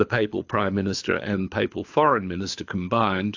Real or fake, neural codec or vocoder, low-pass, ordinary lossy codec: fake; codec, 24 kHz, 6 kbps, HILCodec; 7.2 kHz; AAC, 48 kbps